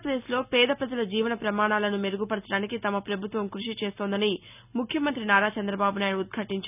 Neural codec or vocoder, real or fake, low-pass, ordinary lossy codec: none; real; 3.6 kHz; none